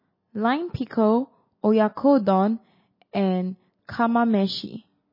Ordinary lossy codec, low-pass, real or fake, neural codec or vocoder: MP3, 24 kbps; 5.4 kHz; real; none